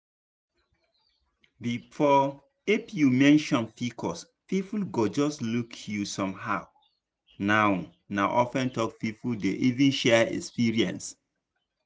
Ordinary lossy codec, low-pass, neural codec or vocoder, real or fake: none; none; none; real